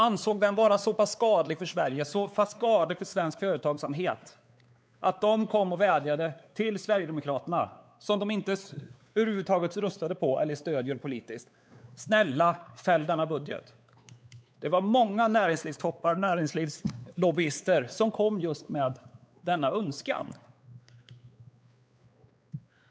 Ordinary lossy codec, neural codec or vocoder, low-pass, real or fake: none; codec, 16 kHz, 4 kbps, X-Codec, WavLM features, trained on Multilingual LibriSpeech; none; fake